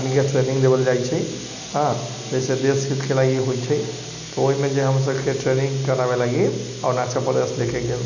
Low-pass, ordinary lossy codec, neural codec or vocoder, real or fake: 7.2 kHz; none; none; real